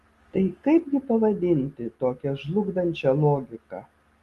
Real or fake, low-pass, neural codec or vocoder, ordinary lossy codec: real; 14.4 kHz; none; Opus, 24 kbps